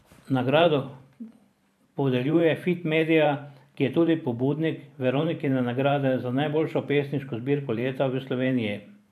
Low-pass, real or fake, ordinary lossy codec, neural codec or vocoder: 14.4 kHz; fake; AAC, 96 kbps; vocoder, 44.1 kHz, 128 mel bands every 512 samples, BigVGAN v2